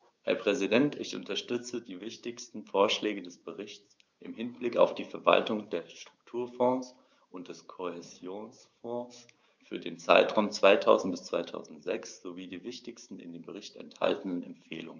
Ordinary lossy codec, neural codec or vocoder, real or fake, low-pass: none; codec, 16 kHz, 6 kbps, DAC; fake; none